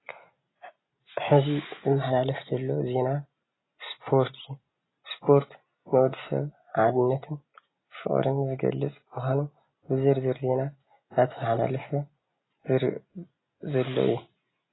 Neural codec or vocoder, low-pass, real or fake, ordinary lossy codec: none; 7.2 kHz; real; AAC, 16 kbps